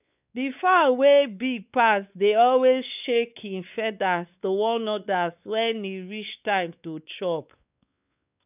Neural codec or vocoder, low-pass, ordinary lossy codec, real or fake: codec, 16 kHz, 4 kbps, X-Codec, WavLM features, trained on Multilingual LibriSpeech; 3.6 kHz; none; fake